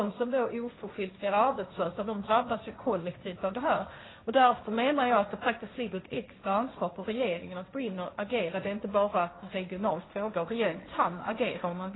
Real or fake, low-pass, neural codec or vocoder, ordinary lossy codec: fake; 7.2 kHz; codec, 16 kHz, 1.1 kbps, Voila-Tokenizer; AAC, 16 kbps